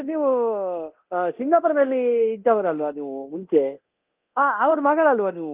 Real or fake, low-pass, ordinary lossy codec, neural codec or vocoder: fake; 3.6 kHz; Opus, 24 kbps; codec, 24 kHz, 0.9 kbps, DualCodec